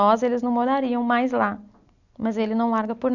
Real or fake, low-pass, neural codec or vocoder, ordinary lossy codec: real; 7.2 kHz; none; none